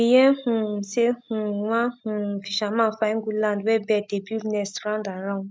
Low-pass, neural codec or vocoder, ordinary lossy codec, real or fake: none; none; none; real